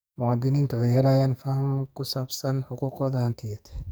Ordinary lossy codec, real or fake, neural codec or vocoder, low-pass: none; fake; codec, 44.1 kHz, 2.6 kbps, SNAC; none